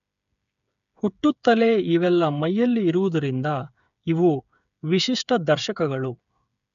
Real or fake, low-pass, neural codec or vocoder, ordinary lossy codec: fake; 7.2 kHz; codec, 16 kHz, 8 kbps, FreqCodec, smaller model; none